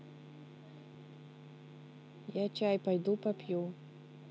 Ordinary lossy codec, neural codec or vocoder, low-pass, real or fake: none; none; none; real